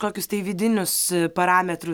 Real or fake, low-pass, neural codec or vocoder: real; 19.8 kHz; none